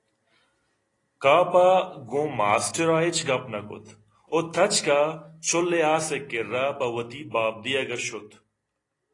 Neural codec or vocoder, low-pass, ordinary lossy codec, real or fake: none; 10.8 kHz; AAC, 32 kbps; real